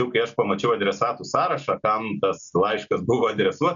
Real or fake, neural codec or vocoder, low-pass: real; none; 7.2 kHz